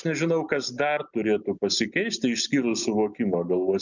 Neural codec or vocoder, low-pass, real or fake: none; 7.2 kHz; real